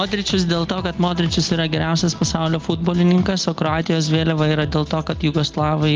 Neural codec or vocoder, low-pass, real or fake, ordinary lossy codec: none; 7.2 kHz; real; Opus, 32 kbps